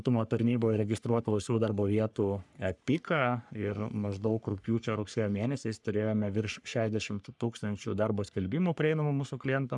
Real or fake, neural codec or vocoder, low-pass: fake; codec, 44.1 kHz, 3.4 kbps, Pupu-Codec; 10.8 kHz